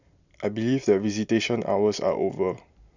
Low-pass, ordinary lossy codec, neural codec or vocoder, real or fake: 7.2 kHz; none; none; real